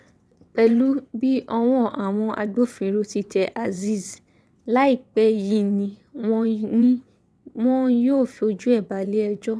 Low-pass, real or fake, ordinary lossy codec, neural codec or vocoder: none; fake; none; vocoder, 22.05 kHz, 80 mel bands, WaveNeXt